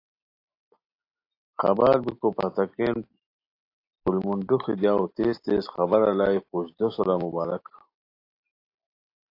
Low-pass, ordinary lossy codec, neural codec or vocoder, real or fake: 5.4 kHz; AAC, 32 kbps; none; real